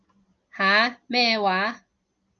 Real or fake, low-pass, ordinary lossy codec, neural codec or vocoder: real; 7.2 kHz; Opus, 24 kbps; none